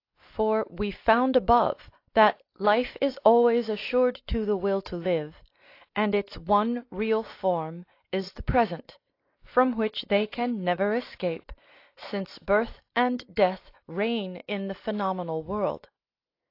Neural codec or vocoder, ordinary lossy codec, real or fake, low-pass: none; AAC, 32 kbps; real; 5.4 kHz